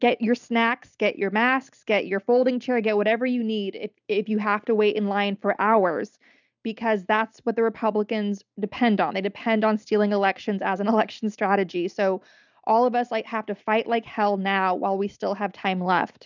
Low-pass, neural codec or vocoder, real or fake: 7.2 kHz; none; real